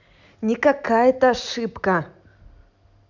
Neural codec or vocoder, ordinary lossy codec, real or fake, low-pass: none; none; real; 7.2 kHz